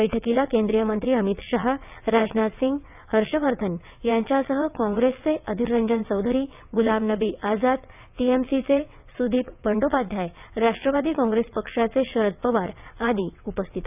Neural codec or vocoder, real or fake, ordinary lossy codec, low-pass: vocoder, 22.05 kHz, 80 mel bands, WaveNeXt; fake; none; 3.6 kHz